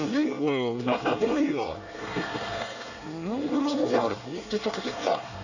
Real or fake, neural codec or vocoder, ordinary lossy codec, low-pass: fake; codec, 24 kHz, 1 kbps, SNAC; none; 7.2 kHz